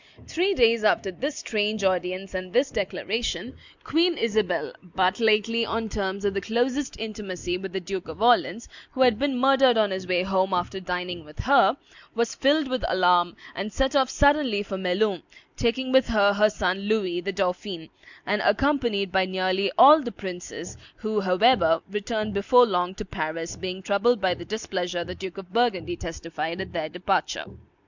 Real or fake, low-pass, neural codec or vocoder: real; 7.2 kHz; none